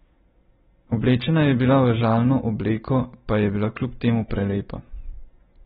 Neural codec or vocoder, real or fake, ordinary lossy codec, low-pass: none; real; AAC, 16 kbps; 7.2 kHz